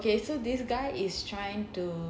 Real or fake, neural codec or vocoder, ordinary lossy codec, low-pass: real; none; none; none